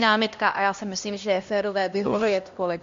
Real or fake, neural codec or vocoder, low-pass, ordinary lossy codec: fake; codec, 16 kHz, 1 kbps, X-Codec, HuBERT features, trained on LibriSpeech; 7.2 kHz; MP3, 48 kbps